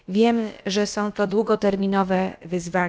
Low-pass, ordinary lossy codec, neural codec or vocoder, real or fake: none; none; codec, 16 kHz, about 1 kbps, DyCAST, with the encoder's durations; fake